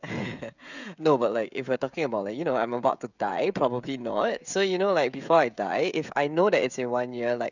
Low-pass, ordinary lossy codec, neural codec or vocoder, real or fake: 7.2 kHz; none; codec, 16 kHz, 16 kbps, FreqCodec, smaller model; fake